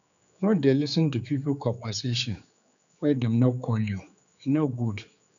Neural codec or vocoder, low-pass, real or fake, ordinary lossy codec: codec, 16 kHz, 4 kbps, X-Codec, HuBERT features, trained on general audio; 7.2 kHz; fake; none